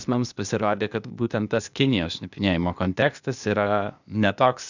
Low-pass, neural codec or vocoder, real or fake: 7.2 kHz; codec, 16 kHz, 0.8 kbps, ZipCodec; fake